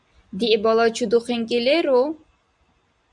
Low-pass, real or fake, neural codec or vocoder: 10.8 kHz; real; none